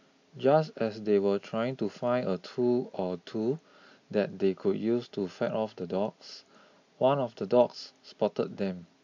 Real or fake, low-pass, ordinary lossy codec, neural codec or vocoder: real; 7.2 kHz; AAC, 48 kbps; none